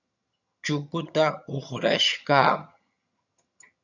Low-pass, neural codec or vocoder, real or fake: 7.2 kHz; vocoder, 22.05 kHz, 80 mel bands, HiFi-GAN; fake